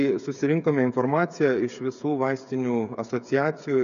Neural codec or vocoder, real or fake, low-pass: codec, 16 kHz, 8 kbps, FreqCodec, smaller model; fake; 7.2 kHz